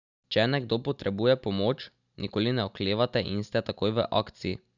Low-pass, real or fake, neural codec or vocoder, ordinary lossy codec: 7.2 kHz; real; none; none